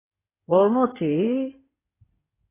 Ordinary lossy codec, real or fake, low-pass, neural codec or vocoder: AAC, 16 kbps; fake; 3.6 kHz; codec, 16 kHz in and 24 kHz out, 2.2 kbps, FireRedTTS-2 codec